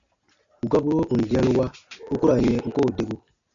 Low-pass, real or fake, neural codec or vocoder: 7.2 kHz; real; none